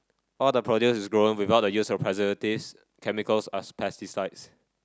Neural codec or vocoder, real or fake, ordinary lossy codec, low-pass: none; real; none; none